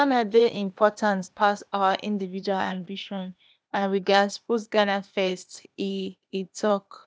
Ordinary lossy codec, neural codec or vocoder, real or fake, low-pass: none; codec, 16 kHz, 0.8 kbps, ZipCodec; fake; none